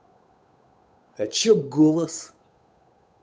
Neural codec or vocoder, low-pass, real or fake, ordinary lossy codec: codec, 16 kHz, 8 kbps, FunCodec, trained on Chinese and English, 25 frames a second; none; fake; none